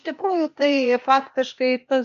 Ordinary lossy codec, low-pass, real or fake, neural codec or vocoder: MP3, 48 kbps; 7.2 kHz; fake; codec, 16 kHz, 0.8 kbps, ZipCodec